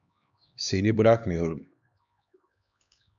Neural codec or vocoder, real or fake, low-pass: codec, 16 kHz, 2 kbps, X-Codec, HuBERT features, trained on LibriSpeech; fake; 7.2 kHz